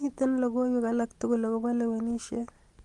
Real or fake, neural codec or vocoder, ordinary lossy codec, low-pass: real; none; Opus, 24 kbps; 10.8 kHz